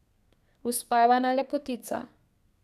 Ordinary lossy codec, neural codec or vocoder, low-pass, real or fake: none; codec, 32 kHz, 1.9 kbps, SNAC; 14.4 kHz; fake